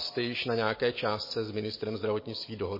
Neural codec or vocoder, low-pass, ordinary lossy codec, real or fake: none; 5.4 kHz; MP3, 24 kbps; real